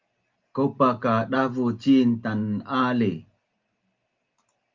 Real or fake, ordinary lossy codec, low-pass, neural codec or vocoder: real; Opus, 32 kbps; 7.2 kHz; none